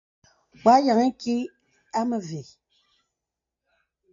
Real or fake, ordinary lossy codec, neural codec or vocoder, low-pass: real; AAC, 48 kbps; none; 7.2 kHz